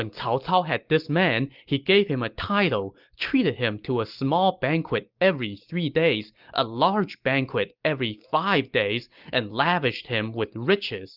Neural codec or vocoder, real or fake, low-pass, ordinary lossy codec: none; real; 5.4 kHz; Opus, 24 kbps